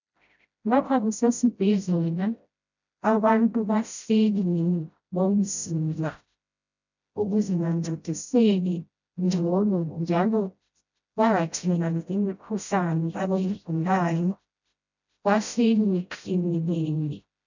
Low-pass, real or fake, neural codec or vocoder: 7.2 kHz; fake; codec, 16 kHz, 0.5 kbps, FreqCodec, smaller model